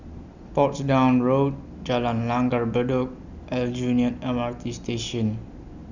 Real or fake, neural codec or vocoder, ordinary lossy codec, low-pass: real; none; none; 7.2 kHz